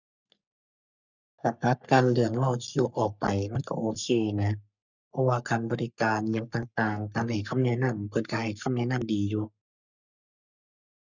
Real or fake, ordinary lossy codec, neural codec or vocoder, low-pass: fake; none; codec, 44.1 kHz, 3.4 kbps, Pupu-Codec; 7.2 kHz